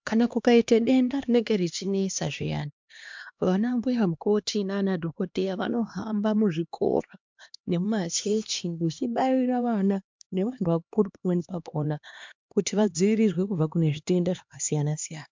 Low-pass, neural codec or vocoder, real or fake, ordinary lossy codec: 7.2 kHz; codec, 16 kHz, 2 kbps, X-Codec, HuBERT features, trained on LibriSpeech; fake; MP3, 64 kbps